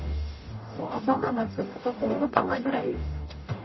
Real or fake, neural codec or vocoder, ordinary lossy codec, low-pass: fake; codec, 44.1 kHz, 0.9 kbps, DAC; MP3, 24 kbps; 7.2 kHz